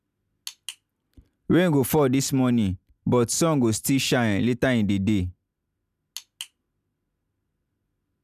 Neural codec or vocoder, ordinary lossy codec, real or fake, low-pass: none; none; real; 14.4 kHz